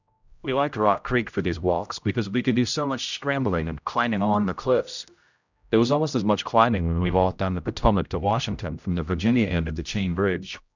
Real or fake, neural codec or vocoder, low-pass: fake; codec, 16 kHz, 0.5 kbps, X-Codec, HuBERT features, trained on general audio; 7.2 kHz